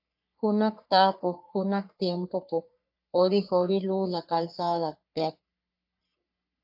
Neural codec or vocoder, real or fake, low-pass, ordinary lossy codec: codec, 44.1 kHz, 3.4 kbps, Pupu-Codec; fake; 5.4 kHz; AAC, 48 kbps